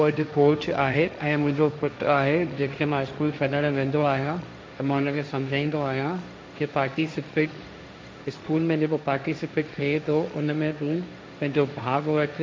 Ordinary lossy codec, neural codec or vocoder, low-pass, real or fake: none; codec, 16 kHz, 1.1 kbps, Voila-Tokenizer; none; fake